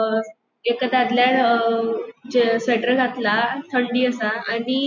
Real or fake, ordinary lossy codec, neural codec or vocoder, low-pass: real; none; none; 7.2 kHz